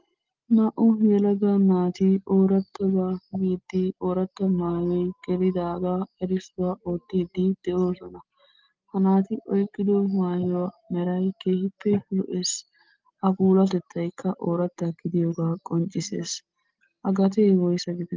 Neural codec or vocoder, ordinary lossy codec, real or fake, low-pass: none; Opus, 32 kbps; real; 7.2 kHz